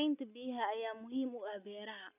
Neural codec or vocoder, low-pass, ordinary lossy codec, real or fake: none; 3.6 kHz; none; real